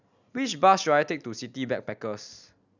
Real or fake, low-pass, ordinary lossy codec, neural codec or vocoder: real; 7.2 kHz; none; none